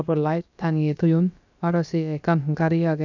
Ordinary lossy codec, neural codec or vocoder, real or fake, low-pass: none; codec, 16 kHz, about 1 kbps, DyCAST, with the encoder's durations; fake; 7.2 kHz